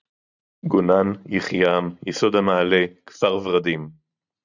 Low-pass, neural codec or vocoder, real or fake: 7.2 kHz; vocoder, 44.1 kHz, 128 mel bands every 512 samples, BigVGAN v2; fake